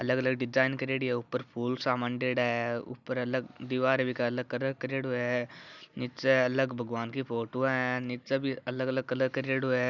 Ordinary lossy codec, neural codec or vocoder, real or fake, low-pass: none; none; real; 7.2 kHz